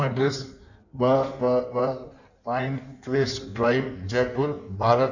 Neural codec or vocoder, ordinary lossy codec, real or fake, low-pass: codec, 16 kHz in and 24 kHz out, 1.1 kbps, FireRedTTS-2 codec; none; fake; 7.2 kHz